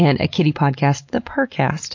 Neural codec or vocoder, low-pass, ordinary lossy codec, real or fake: none; 7.2 kHz; MP3, 48 kbps; real